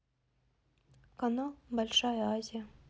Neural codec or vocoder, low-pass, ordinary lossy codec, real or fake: none; none; none; real